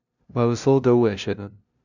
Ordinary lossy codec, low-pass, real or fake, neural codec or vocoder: none; 7.2 kHz; fake; codec, 16 kHz, 0.5 kbps, FunCodec, trained on LibriTTS, 25 frames a second